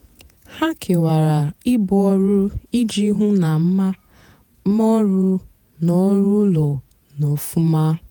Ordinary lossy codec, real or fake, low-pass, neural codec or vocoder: none; fake; none; vocoder, 48 kHz, 128 mel bands, Vocos